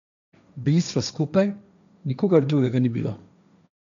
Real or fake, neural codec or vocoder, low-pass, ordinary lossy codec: fake; codec, 16 kHz, 1.1 kbps, Voila-Tokenizer; 7.2 kHz; none